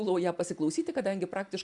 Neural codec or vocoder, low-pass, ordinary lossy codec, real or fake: none; 10.8 kHz; MP3, 96 kbps; real